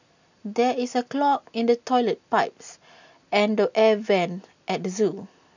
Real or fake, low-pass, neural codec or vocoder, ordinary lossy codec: real; 7.2 kHz; none; none